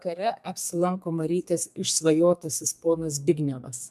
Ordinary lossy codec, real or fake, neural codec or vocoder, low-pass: MP3, 96 kbps; fake; codec, 32 kHz, 1.9 kbps, SNAC; 14.4 kHz